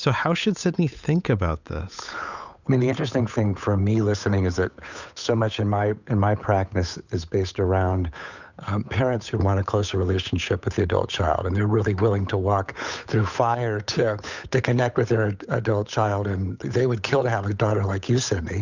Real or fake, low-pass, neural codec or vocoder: fake; 7.2 kHz; codec, 16 kHz, 8 kbps, FunCodec, trained on Chinese and English, 25 frames a second